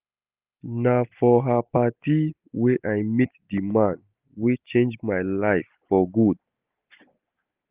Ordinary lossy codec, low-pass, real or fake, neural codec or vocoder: Opus, 24 kbps; 3.6 kHz; real; none